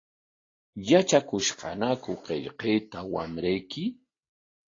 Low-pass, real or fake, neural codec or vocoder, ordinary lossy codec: 7.2 kHz; real; none; AAC, 32 kbps